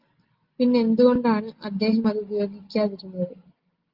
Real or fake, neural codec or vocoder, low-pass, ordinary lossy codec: real; none; 5.4 kHz; Opus, 32 kbps